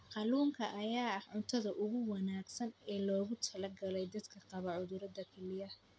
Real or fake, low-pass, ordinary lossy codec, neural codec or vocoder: real; none; none; none